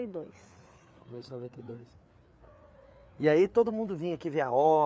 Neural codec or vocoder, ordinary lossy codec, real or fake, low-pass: codec, 16 kHz, 4 kbps, FreqCodec, larger model; none; fake; none